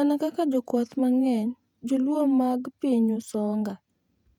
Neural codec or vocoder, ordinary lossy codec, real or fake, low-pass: vocoder, 48 kHz, 128 mel bands, Vocos; none; fake; 19.8 kHz